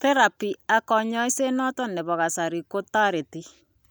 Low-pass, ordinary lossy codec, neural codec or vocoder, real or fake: none; none; none; real